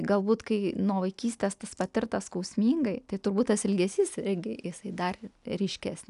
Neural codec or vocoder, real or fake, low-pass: none; real; 10.8 kHz